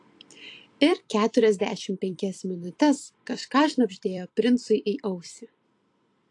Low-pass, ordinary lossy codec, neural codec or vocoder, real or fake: 10.8 kHz; AAC, 48 kbps; vocoder, 44.1 kHz, 128 mel bands every 256 samples, BigVGAN v2; fake